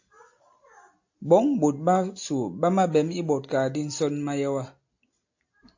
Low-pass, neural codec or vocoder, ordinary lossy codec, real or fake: 7.2 kHz; none; AAC, 48 kbps; real